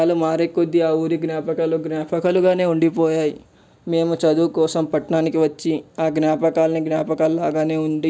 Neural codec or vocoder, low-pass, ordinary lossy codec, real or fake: none; none; none; real